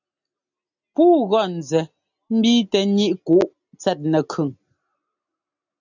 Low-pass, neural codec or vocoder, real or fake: 7.2 kHz; none; real